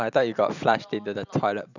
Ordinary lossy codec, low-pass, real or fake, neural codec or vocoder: none; 7.2 kHz; fake; vocoder, 44.1 kHz, 128 mel bands every 256 samples, BigVGAN v2